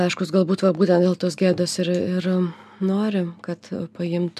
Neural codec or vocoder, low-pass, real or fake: none; 14.4 kHz; real